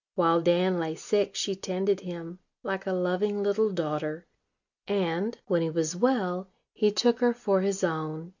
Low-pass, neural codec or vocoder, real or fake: 7.2 kHz; none; real